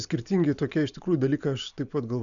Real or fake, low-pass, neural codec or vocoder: real; 7.2 kHz; none